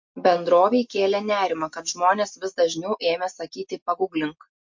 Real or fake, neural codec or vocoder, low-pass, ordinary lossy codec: real; none; 7.2 kHz; MP3, 48 kbps